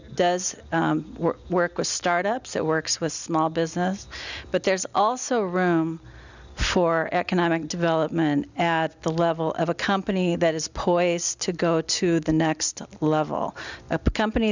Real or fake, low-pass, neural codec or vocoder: real; 7.2 kHz; none